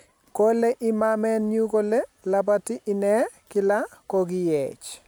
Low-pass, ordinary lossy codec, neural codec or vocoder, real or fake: none; none; none; real